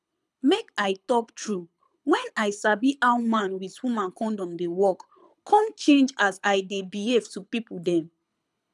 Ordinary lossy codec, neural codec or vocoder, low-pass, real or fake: none; codec, 24 kHz, 6 kbps, HILCodec; none; fake